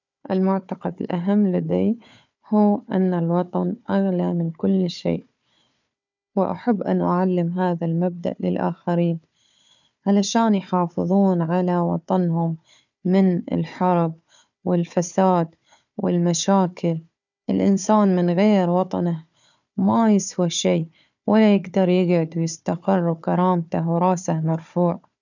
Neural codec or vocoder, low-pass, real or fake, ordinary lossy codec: codec, 16 kHz, 4 kbps, FunCodec, trained on Chinese and English, 50 frames a second; 7.2 kHz; fake; none